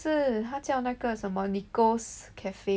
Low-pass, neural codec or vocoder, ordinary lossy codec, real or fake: none; none; none; real